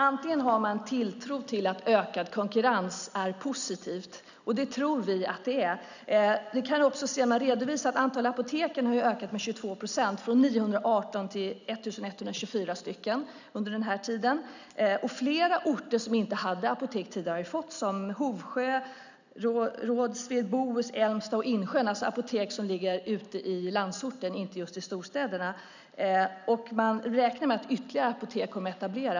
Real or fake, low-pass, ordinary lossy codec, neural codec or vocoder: real; 7.2 kHz; none; none